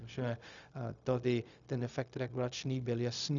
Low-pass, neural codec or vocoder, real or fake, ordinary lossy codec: 7.2 kHz; codec, 16 kHz, 0.4 kbps, LongCat-Audio-Codec; fake; MP3, 96 kbps